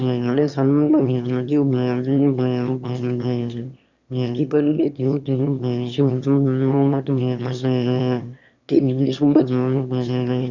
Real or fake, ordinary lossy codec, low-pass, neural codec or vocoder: fake; Opus, 64 kbps; 7.2 kHz; autoencoder, 22.05 kHz, a latent of 192 numbers a frame, VITS, trained on one speaker